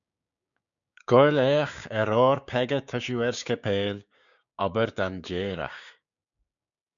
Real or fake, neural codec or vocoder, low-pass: fake; codec, 16 kHz, 6 kbps, DAC; 7.2 kHz